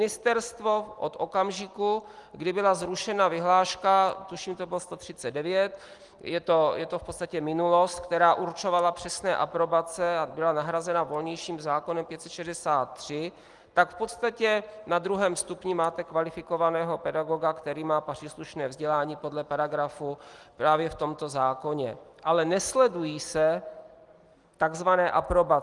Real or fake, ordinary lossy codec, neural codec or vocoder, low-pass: real; Opus, 24 kbps; none; 10.8 kHz